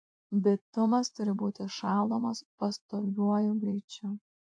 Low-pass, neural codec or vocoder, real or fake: 9.9 kHz; vocoder, 24 kHz, 100 mel bands, Vocos; fake